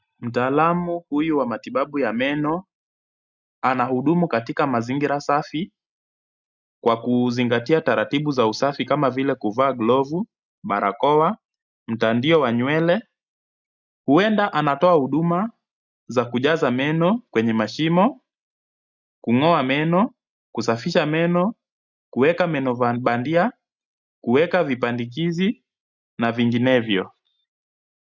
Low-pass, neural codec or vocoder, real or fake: 7.2 kHz; none; real